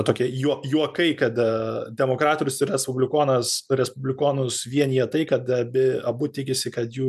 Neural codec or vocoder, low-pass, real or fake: none; 14.4 kHz; real